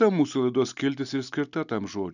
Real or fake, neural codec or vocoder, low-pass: real; none; 7.2 kHz